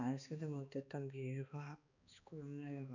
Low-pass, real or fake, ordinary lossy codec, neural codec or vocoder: 7.2 kHz; fake; none; codec, 16 kHz, 2 kbps, X-Codec, HuBERT features, trained on balanced general audio